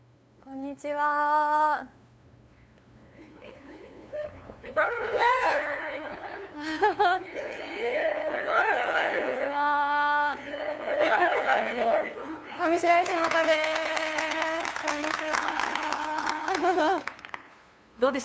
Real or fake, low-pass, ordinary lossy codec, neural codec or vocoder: fake; none; none; codec, 16 kHz, 2 kbps, FunCodec, trained on LibriTTS, 25 frames a second